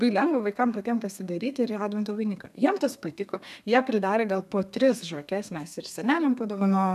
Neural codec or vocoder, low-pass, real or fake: codec, 32 kHz, 1.9 kbps, SNAC; 14.4 kHz; fake